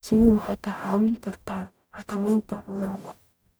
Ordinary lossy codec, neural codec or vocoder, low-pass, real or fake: none; codec, 44.1 kHz, 0.9 kbps, DAC; none; fake